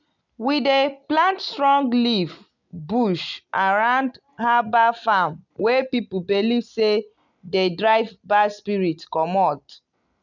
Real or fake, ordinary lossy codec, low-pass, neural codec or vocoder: real; none; 7.2 kHz; none